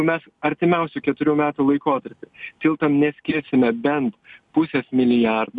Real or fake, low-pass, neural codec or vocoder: real; 10.8 kHz; none